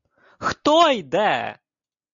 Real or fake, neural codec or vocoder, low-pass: real; none; 7.2 kHz